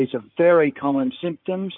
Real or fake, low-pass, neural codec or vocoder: fake; 5.4 kHz; codec, 16 kHz in and 24 kHz out, 2.2 kbps, FireRedTTS-2 codec